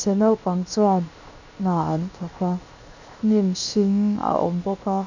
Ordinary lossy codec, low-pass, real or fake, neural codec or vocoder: none; 7.2 kHz; fake; codec, 16 kHz, 0.7 kbps, FocalCodec